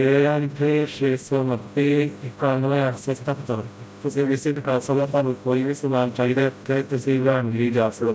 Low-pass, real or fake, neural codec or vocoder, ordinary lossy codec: none; fake; codec, 16 kHz, 0.5 kbps, FreqCodec, smaller model; none